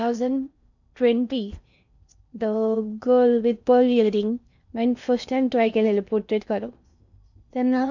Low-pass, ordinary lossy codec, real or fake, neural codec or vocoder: 7.2 kHz; none; fake; codec, 16 kHz in and 24 kHz out, 0.6 kbps, FocalCodec, streaming, 4096 codes